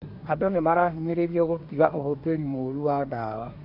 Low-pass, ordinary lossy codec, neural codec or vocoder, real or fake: 5.4 kHz; none; codec, 24 kHz, 1 kbps, SNAC; fake